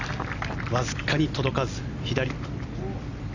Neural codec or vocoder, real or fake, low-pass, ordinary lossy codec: none; real; 7.2 kHz; none